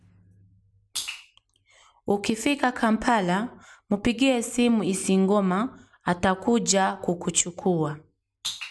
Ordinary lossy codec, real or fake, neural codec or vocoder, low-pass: none; real; none; none